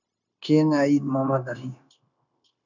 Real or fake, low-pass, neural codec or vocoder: fake; 7.2 kHz; codec, 16 kHz, 0.9 kbps, LongCat-Audio-Codec